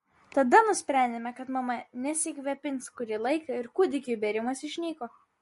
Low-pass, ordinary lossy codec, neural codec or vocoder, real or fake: 14.4 kHz; MP3, 48 kbps; none; real